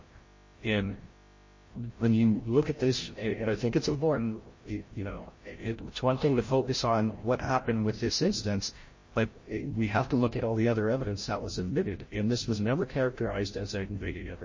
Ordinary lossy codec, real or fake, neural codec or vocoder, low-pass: MP3, 32 kbps; fake; codec, 16 kHz, 0.5 kbps, FreqCodec, larger model; 7.2 kHz